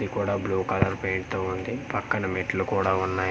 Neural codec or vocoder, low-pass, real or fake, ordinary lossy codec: none; none; real; none